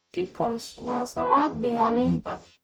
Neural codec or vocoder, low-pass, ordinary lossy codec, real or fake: codec, 44.1 kHz, 0.9 kbps, DAC; none; none; fake